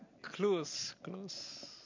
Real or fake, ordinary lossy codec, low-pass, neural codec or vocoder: real; MP3, 48 kbps; 7.2 kHz; none